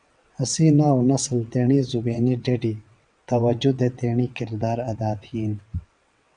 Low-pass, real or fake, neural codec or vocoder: 9.9 kHz; fake; vocoder, 22.05 kHz, 80 mel bands, WaveNeXt